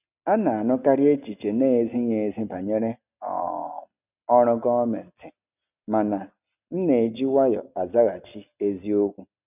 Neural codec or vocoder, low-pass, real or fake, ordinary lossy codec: none; 3.6 kHz; real; none